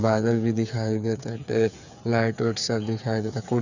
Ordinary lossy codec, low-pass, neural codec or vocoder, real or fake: none; 7.2 kHz; codec, 16 kHz, 8 kbps, FreqCodec, smaller model; fake